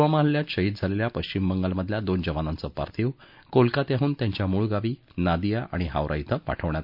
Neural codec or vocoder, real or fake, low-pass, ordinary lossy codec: none; real; 5.4 kHz; MP3, 48 kbps